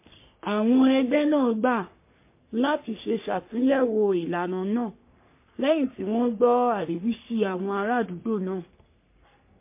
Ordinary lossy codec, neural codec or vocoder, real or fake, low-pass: MP3, 24 kbps; codec, 44.1 kHz, 3.4 kbps, Pupu-Codec; fake; 3.6 kHz